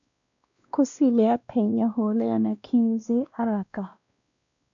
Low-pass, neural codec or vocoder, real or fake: 7.2 kHz; codec, 16 kHz, 1 kbps, X-Codec, WavLM features, trained on Multilingual LibriSpeech; fake